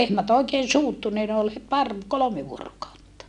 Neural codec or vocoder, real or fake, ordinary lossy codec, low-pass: none; real; none; 10.8 kHz